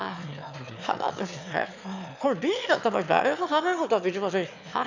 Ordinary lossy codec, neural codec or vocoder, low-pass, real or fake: MP3, 64 kbps; autoencoder, 22.05 kHz, a latent of 192 numbers a frame, VITS, trained on one speaker; 7.2 kHz; fake